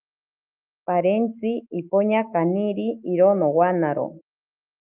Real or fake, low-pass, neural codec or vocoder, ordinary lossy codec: fake; 3.6 kHz; autoencoder, 48 kHz, 128 numbers a frame, DAC-VAE, trained on Japanese speech; Opus, 24 kbps